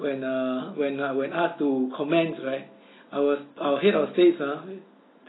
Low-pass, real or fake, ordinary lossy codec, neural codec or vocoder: 7.2 kHz; real; AAC, 16 kbps; none